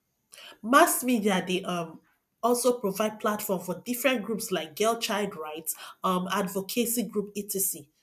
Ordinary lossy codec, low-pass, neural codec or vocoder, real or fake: none; 14.4 kHz; none; real